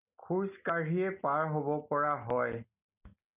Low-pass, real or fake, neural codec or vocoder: 3.6 kHz; real; none